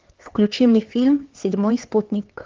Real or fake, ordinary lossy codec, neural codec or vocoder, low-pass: fake; Opus, 16 kbps; codec, 16 kHz, 4 kbps, X-Codec, HuBERT features, trained on LibriSpeech; 7.2 kHz